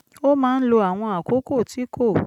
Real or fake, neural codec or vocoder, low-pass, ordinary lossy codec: real; none; 19.8 kHz; none